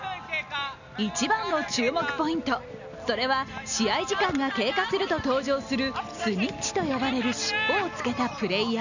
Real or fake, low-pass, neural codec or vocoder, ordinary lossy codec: real; 7.2 kHz; none; none